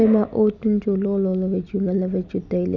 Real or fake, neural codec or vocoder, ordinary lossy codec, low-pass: real; none; none; 7.2 kHz